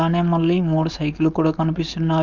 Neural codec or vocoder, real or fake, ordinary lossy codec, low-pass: codec, 16 kHz, 4.8 kbps, FACodec; fake; none; 7.2 kHz